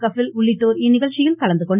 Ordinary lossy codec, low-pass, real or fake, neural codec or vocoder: none; 3.6 kHz; real; none